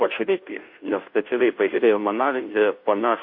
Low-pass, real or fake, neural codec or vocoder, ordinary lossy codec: 5.4 kHz; fake; codec, 16 kHz, 0.5 kbps, FunCodec, trained on Chinese and English, 25 frames a second; MP3, 32 kbps